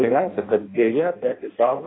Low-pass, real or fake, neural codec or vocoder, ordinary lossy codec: 7.2 kHz; fake; codec, 16 kHz in and 24 kHz out, 0.6 kbps, FireRedTTS-2 codec; AAC, 16 kbps